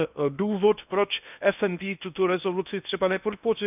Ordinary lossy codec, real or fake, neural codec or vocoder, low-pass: none; fake; codec, 16 kHz in and 24 kHz out, 0.8 kbps, FocalCodec, streaming, 65536 codes; 3.6 kHz